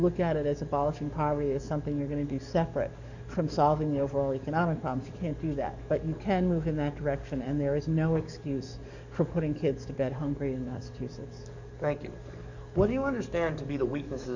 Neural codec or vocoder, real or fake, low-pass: codec, 44.1 kHz, 7.8 kbps, DAC; fake; 7.2 kHz